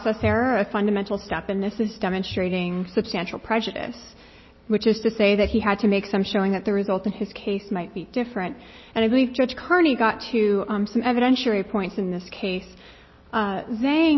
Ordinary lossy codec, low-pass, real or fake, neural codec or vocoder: MP3, 24 kbps; 7.2 kHz; real; none